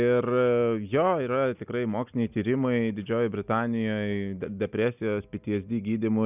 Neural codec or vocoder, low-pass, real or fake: none; 3.6 kHz; real